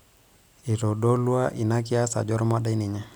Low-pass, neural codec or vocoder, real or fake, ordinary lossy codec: none; none; real; none